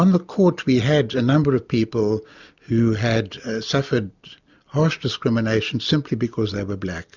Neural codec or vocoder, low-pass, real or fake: none; 7.2 kHz; real